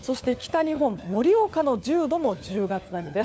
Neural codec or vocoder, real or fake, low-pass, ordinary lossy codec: codec, 16 kHz, 4 kbps, FunCodec, trained on LibriTTS, 50 frames a second; fake; none; none